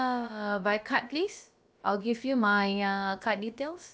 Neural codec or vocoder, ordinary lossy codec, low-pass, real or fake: codec, 16 kHz, about 1 kbps, DyCAST, with the encoder's durations; none; none; fake